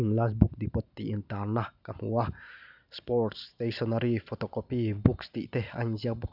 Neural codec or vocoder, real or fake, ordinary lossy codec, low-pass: none; real; none; 5.4 kHz